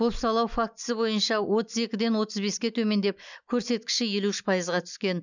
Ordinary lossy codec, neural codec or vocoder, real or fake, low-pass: none; none; real; 7.2 kHz